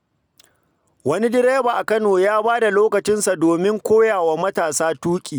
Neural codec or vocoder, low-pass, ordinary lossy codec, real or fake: none; none; none; real